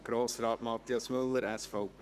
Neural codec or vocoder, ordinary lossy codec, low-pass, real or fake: codec, 44.1 kHz, 7.8 kbps, Pupu-Codec; none; 14.4 kHz; fake